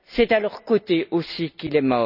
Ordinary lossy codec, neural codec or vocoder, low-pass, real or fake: none; none; 5.4 kHz; real